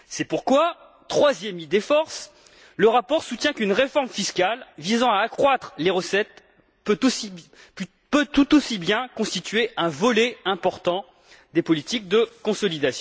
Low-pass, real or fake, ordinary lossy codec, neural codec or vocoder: none; real; none; none